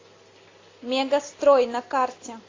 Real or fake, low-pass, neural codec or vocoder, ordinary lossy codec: real; 7.2 kHz; none; AAC, 32 kbps